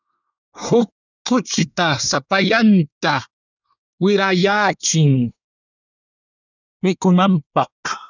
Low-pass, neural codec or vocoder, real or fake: 7.2 kHz; codec, 24 kHz, 1 kbps, SNAC; fake